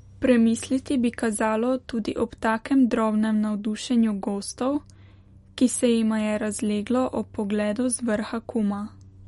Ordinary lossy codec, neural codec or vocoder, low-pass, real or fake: MP3, 48 kbps; none; 19.8 kHz; real